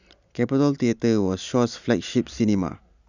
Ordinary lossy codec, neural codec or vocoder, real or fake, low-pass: none; none; real; 7.2 kHz